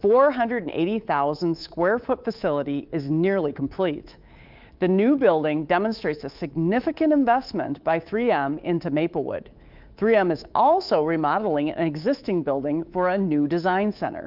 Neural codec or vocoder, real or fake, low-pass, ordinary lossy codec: codec, 24 kHz, 3.1 kbps, DualCodec; fake; 5.4 kHz; Opus, 24 kbps